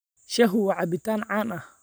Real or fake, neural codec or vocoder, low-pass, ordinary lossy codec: real; none; none; none